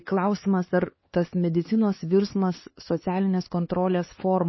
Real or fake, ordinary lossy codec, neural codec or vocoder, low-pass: fake; MP3, 24 kbps; codec, 16 kHz, 4 kbps, X-Codec, HuBERT features, trained on LibriSpeech; 7.2 kHz